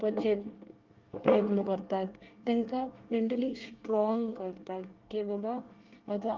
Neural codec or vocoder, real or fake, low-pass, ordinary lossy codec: codec, 24 kHz, 1 kbps, SNAC; fake; 7.2 kHz; Opus, 32 kbps